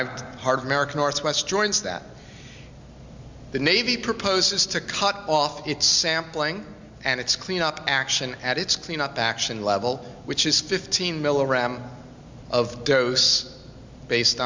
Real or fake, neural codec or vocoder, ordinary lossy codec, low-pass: real; none; MP3, 64 kbps; 7.2 kHz